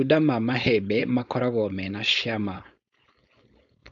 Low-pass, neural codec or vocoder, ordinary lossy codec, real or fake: 7.2 kHz; codec, 16 kHz, 4.8 kbps, FACodec; none; fake